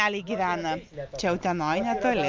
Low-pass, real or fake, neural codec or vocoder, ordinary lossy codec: 7.2 kHz; real; none; Opus, 24 kbps